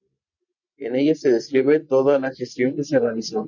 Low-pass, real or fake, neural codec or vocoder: 7.2 kHz; real; none